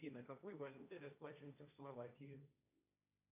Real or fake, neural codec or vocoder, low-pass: fake; codec, 16 kHz, 1.1 kbps, Voila-Tokenizer; 3.6 kHz